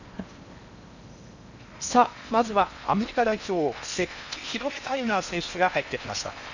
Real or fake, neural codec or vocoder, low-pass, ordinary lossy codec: fake; codec, 16 kHz in and 24 kHz out, 0.8 kbps, FocalCodec, streaming, 65536 codes; 7.2 kHz; none